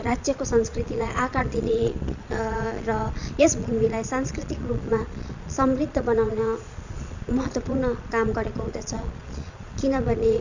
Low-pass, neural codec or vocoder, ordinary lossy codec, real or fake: 7.2 kHz; vocoder, 22.05 kHz, 80 mel bands, Vocos; Opus, 64 kbps; fake